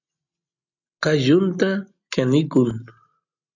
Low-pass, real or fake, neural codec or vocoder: 7.2 kHz; real; none